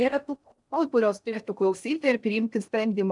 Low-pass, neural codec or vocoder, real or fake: 10.8 kHz; codec, 16 kHz in and 24 kHz out, 0.6 kbps, FocalCodec, streaming, 4096 codes; fake